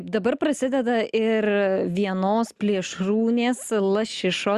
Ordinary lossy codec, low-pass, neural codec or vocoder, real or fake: Opus, 64 kbps; 14.4 kHz; none; real